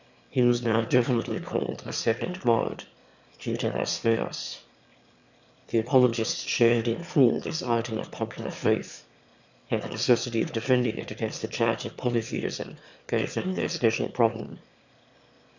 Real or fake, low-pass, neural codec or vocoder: fake; 7.2 kHz; autoencoder, 22.05 kHz, a latent of 192 numbers a frame, VITS, trained on one speaker